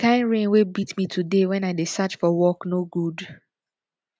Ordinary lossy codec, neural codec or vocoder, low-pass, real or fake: none; none; none; real